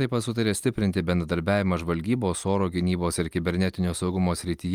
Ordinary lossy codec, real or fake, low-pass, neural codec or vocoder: Opus, 32 kbps; real; 19.8 kHz; none